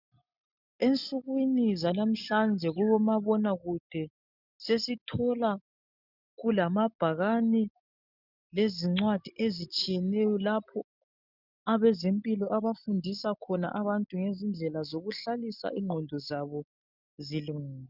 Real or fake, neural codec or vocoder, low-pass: real; none; 5.4 kHz